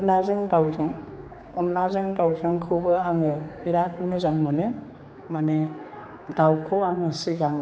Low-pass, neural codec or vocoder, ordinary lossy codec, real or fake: none; codec, 16 kHz, 4 kbps, X-Codec, HuBERT features, trained on general audio; none; fake